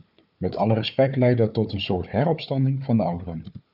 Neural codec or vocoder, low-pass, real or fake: codec, 16 kHz, 4 kbps, FunCodec, trained on Chinese and English, 50 frames a second; 5.4 kHz; fake